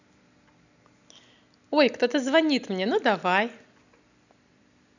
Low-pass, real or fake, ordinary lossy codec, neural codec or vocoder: 7.2 kHz; real; none; none